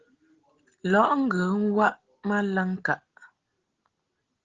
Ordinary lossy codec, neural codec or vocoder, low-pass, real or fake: Opus, 16 kbps; none; 7.2 kHz; real